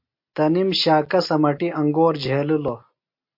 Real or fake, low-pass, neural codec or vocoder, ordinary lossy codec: real; 5.4 kHz; none; MP3, 32 kbps